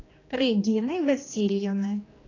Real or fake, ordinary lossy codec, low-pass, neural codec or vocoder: fake; AAC, 32 kbps; 7.2 kHz; codec, 16 kHz, 2 kbps, X-Codec, HuBERT features, trained on general audio